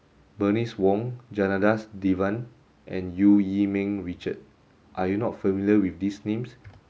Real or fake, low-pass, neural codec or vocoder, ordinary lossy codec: real; none; none; none